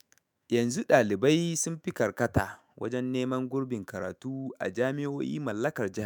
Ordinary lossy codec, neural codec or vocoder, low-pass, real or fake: none; autoencoder, 48 kHz, 128 numbers a frame, DAC-VAE, trained on Japanese speech; none; fake